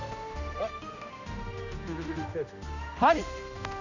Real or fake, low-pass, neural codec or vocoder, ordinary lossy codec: fake; 7.2 kHz; codec, 16 kHz, 1 kbps, X-Codec, HuBERT features, trained on general audio; none